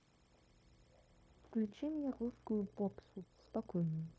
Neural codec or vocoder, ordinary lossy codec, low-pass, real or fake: codec, 16 kHz, 0.9 kbps, LongCat-Audio-Codec; none; none; fake